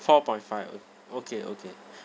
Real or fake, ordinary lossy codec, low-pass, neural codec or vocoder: real; none; none; none